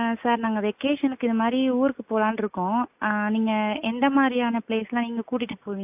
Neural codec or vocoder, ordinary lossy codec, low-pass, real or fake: none; AAC, 32 kbps; 3.6 kHz; real